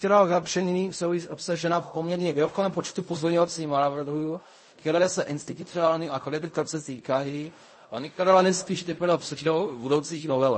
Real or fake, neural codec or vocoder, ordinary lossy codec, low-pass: fake; codec, 16 kHz in and 24 kHz out, 0.4 kbps, LongCat-Audio-Codec, fine tuned four codebook decoder; MP3, 32 kbps; 9.9 kHz